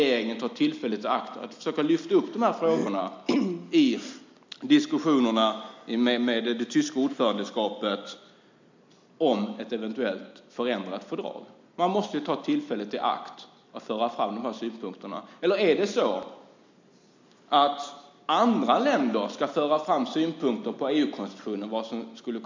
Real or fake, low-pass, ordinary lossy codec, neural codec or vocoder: real; 7.2 kHz; MP3, 64 kbps; none